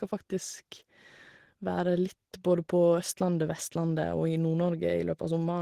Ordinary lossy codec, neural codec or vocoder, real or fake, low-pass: Opus, 16 kbps; none; real; 14.4 kHz